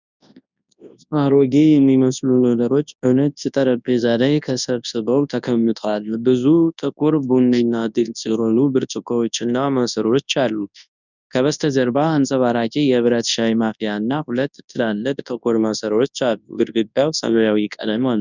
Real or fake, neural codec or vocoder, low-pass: fake; codec, 24 kHz, 0.9 kbps, WavTokenizer, large speech release; 7.2 kHz